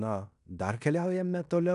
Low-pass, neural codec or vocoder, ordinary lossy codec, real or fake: 10.8 kHz; codec, 16 kHz in and 24 kHz out, 0.9 kbps, LongCat-Audio-Codec, fine tuned four codebook decoder; AAC, 96 kbps; fake